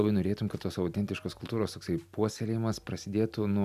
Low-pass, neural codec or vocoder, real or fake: 14.4 kHz; none; real